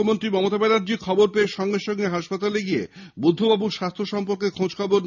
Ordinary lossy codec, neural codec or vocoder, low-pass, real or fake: none; none; 7.2 kHz; real